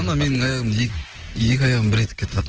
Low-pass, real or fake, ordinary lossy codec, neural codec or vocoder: 7.2 kHz; real; Opus, 16 kbps; none